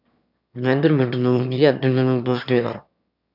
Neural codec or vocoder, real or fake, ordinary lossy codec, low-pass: autoencoder, 22.05 kHz, a latent of 192 numbers a frame, VITS, trained on one speaker; fake; none; 5.4 kHz